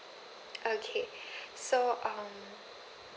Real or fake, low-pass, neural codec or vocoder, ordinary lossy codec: real; none; none; none